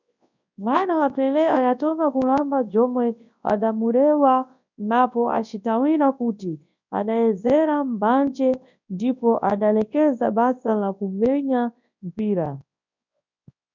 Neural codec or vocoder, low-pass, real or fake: codec, 24 kHz, 0.9 kbps, WavTokenizer, large speech release; 7.2 kHz; fake